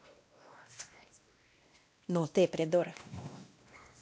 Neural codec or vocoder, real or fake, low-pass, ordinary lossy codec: codec, 16 kHz, 1 kbps, X-Codec, WavLM features, trained on Multilingual LibriSpeech; fake; none; none